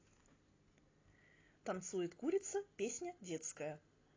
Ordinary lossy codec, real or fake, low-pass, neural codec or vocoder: AAC, 32 kbps; real; 7.2 kHz; none